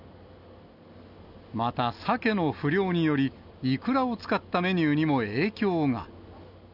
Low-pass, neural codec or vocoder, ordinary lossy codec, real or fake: 5.4 kHz; none; none; real